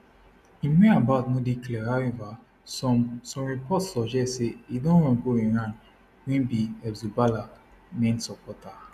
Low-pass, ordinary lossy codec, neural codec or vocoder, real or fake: 14.4 kHz; none; none; real